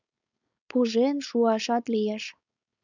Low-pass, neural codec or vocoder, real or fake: 7.2 kHz; codec, 16 kHz, 4.8 kbps, FACodec; fake